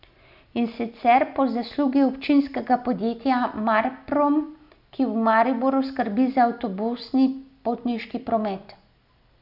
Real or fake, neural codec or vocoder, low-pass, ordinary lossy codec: real; none; 5.4 kHz; none